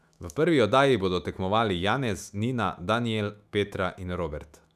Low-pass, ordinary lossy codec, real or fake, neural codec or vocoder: 14.4 kHz; none; fake; autoencoder, 48 kHz, 128 numbers a frame, DAC-VAE, trained on Japanese speech